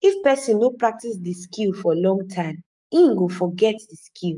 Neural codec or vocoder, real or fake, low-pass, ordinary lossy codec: codec, 44.1 kHz, 7.8 kbps, DAC; fake; 10.8 kHz; none